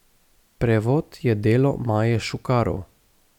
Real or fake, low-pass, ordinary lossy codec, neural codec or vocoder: real; 19.8 kHz; none; none